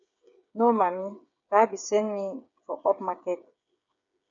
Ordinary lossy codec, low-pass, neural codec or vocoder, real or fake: MP3, 64 kbps; 7.2 kHz; codec, 16 kHz, 8 kbps, FreqCodec, smaller model; fake